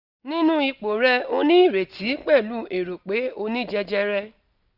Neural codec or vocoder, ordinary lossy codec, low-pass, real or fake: none; none; 5.4 kHz; real